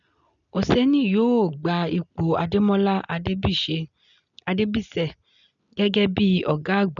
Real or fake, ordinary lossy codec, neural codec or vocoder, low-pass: real; none; none; 7.2 kHz